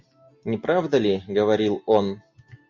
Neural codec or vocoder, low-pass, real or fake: none; 7.2 kHz; real